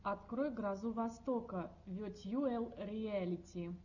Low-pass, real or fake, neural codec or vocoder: 7.2 kHz; real; none